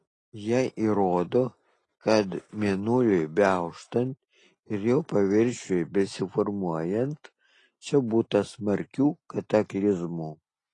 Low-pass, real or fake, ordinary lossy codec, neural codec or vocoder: 10.8 kHz; real; AAC, 32 kbps; none